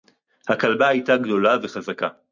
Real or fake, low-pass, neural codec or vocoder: real; 7.2 kHz; none